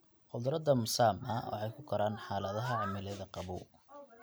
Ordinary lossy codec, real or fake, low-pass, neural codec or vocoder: none; real; none; none